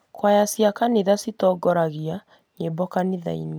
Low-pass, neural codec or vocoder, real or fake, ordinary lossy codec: none; none; real; none